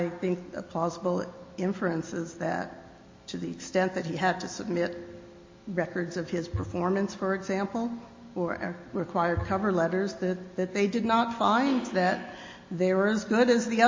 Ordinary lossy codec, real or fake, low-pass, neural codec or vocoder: MP3, 32 kbps; real; 7.2 kHz; none